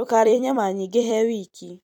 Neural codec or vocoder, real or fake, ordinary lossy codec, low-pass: vocoder, 44.1 kHz, 128 mel bands every 256 samples, BigVGAN v2; fake; none; 19.8 kHz